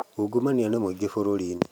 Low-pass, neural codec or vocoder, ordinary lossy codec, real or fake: 19.8 kHz; vocoder, 44.1 kHz, 128 mel bands every 512 samples, BigVGAN v2; none; fake